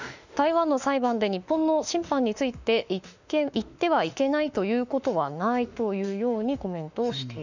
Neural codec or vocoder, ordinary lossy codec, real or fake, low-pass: autoencoder, 48 kHz, 32 numbers a frame, DAC-VAE, trained on Japanese speech; none; fake; 7.2 kHz